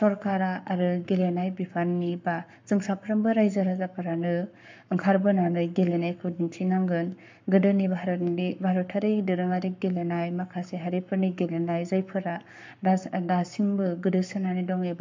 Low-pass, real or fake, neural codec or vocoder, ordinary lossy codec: 7.2 kHz; fake; codec, 44.1 kHz, 7.8 kbps, Pupu-Codec; MP3, 64 kbps